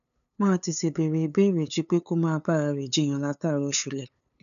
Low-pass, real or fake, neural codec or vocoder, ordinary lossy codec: 7.2 kHz; fake; codec, 16 kHz, 8 kbps, FunCodec, trained on LibriTTS, 25 frames a second; none